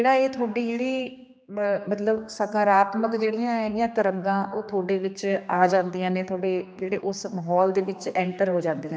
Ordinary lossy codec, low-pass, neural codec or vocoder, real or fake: none; none; codec, 16 kHz, 2 kbps, X-Codec, HuBERT features, trained on general audio; fake